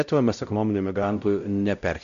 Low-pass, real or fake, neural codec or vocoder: 7.2 kHz; fake; codec, 16 kHz, 0.5 kbps, X-Codec, WavLM features, trained on Multilingual LibriSpeech